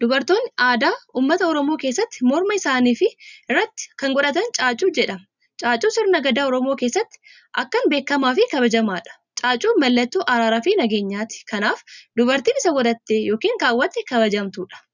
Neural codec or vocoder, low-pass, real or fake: none; 7.2 kHz; real